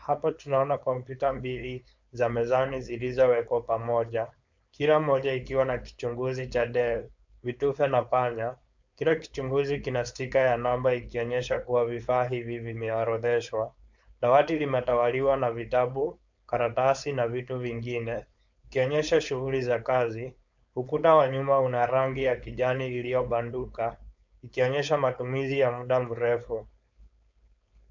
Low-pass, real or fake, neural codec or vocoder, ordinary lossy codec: 7.2 kHz; fake; codec, 16 kHz, 4.8 kbps, FACodec; MP3, 64 kbps